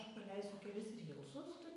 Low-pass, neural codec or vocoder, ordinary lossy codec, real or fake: 14.4 kHz; none; MP3, 48 kbps; real